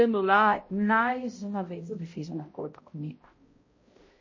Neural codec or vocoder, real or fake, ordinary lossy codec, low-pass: codec, 16 kHz, 0.5 kbps, X-Codec, HuBERT features, trained on balanced general audio; fake; MP3, 32 kbps; 7.2 kHz